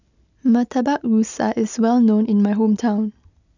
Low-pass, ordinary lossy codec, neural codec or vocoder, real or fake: 7.2 kHz; none; none; real